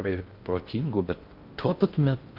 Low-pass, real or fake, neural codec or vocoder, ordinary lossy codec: 5.4 kHz; fake; codec, 16 kHz in and 24 kHz out, 0.6 kbps, FocalCodec, streaming, 2048 codes; Opus, 32 kbps